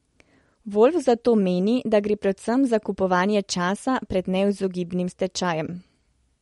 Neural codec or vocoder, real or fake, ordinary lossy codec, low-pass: none; real; MP3, 48 kbps; 19.8 kHz